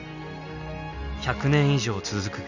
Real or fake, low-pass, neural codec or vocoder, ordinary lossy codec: real; 7.2 kHz; none; none